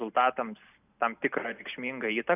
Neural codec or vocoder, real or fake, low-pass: none; real; 3.6 kHz